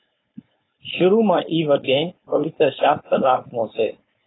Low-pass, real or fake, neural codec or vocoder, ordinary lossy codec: 7.2 kHz; fake; codec, 16 kHz, 4.8 kbps, FACodec; AAC, 16 kbps